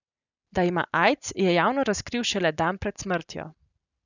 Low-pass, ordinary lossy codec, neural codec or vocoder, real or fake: 7.2 kHz; none; none; real